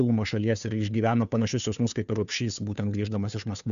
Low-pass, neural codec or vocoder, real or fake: 7.2 kHz; codec, 16 kHz, 2 kbps, FunCodec, trained on Chinese and English, 25 frames a second; fake